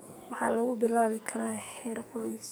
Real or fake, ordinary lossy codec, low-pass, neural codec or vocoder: fake; none; none; codec, 44.1 kHz, 2.6 kbps, SNAC